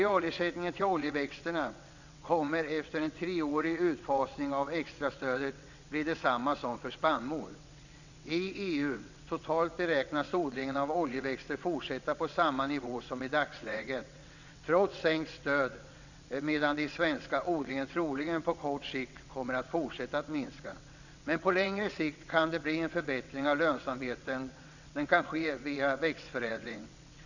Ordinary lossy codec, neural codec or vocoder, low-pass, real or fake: none; vocoder, 22.05 kHz, 80 mel bands, WaveNeXt; 7.2 kHz; fake